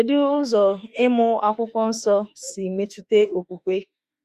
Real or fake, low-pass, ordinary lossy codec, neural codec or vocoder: fake; 14.4 kHz; Opus, 64 kbps; autoencoder, 48 kHz, 32 numbers a frame, DAC-VAE, trained on Japanese speech